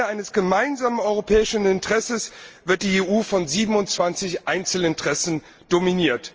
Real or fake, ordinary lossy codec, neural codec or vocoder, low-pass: real; Opus, 24 kbps; none; 7.2 kHz